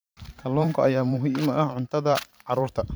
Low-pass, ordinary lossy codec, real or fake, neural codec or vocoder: none; none; fake; vocoder, 44.1 kHz, 128 mel bands every 512 samples, BigVGAN v2